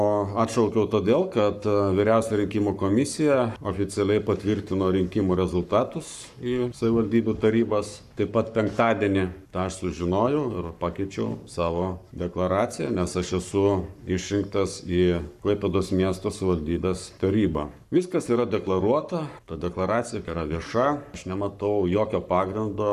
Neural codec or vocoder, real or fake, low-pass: codec, 44.1 kHz, 7.8 kbps, Pupu-Codec; fake; 14.4 kHz